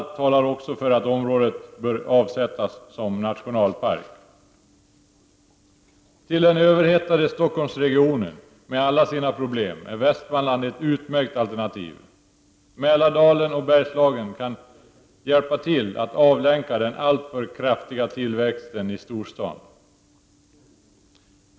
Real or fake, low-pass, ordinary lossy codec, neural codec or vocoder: real; none; none; none